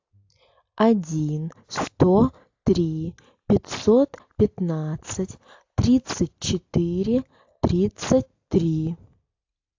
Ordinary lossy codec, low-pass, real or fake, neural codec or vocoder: AAC, 48 kbps; 7.2 kHz; real; none